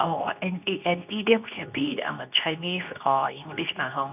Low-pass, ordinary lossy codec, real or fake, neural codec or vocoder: 3.6 kHz; none; fake; codec, 24 kHz, 0.9 kbps, WavTokenizer, medium speech release version 2